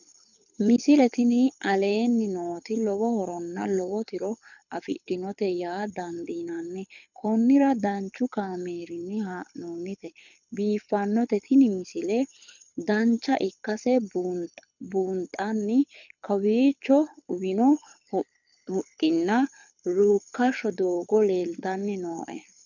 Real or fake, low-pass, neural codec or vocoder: fake; 7.2 kHz; codec, 24 kHz, 6 kbps, HILCodec